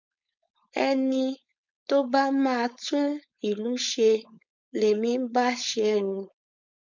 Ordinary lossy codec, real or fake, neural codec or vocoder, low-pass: none; fake; codec, 16 kHz, 4.8 kbps, FACodec; 7.2 kHz